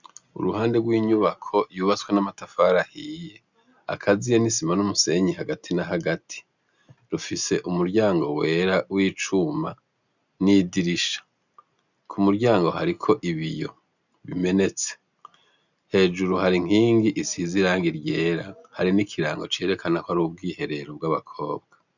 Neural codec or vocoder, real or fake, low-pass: none; real; 7.2 kHz